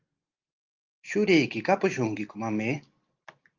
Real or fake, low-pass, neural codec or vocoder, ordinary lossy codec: real; 7.2 kHz; none; Opus, 32 kbps